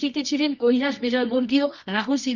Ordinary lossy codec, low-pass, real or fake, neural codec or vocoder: none; 7.2 kHz; fake; codec, 24 kHz, 0.9 kbps, WavTokenizer, medium music audio release